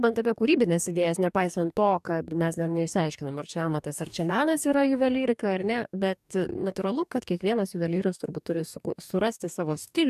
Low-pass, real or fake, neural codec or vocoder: 14.4 kHz; fake; codec, 44.1 kHz, 2.6 kbps, DAC